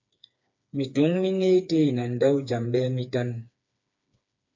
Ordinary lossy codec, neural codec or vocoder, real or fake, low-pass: MP3, 64 kbps; codec, 16 kHz, 4 kbps, FreqCodec, smaller model; fake; 7.2 kHz